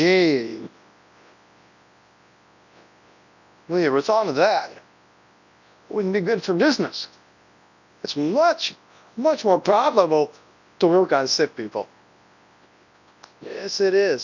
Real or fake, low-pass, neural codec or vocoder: fake; 7.2 kHz; codec, 24 kHz, 0.9 kbps, WavTokenizer, large speech release